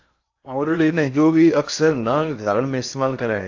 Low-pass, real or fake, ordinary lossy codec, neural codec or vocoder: 7.2 kHz; fake; AAC, 48 kbps; codec, 16 kHz in and 24 kHz out, 0.6 kbps, FocalCodec, streaming, 4096 codes